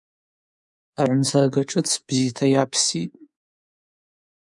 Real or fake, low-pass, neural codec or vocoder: fake; 10.8 kHz; codec, 24 kHz, 3.1 kbps, DualCodec